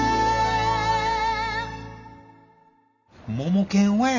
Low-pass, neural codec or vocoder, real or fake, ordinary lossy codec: 7.2 kHz; none; real; none